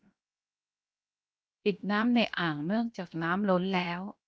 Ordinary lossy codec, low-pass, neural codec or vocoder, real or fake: none; none; codec, 16 kHz, 0.7 kbps, FocalCodec; fake